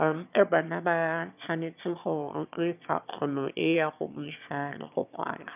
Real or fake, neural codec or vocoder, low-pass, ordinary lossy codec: fake; autoencoder, 22.05 kHz, a latent of 192 numbers a frame, VITS, trained on one speaker; 3.6 kHz; none